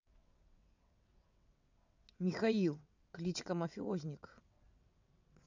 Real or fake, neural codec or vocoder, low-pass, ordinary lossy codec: fake; vocoder, 22.05 kHz, 80 mel bands, WaveNeXt; 7.2 kHz; none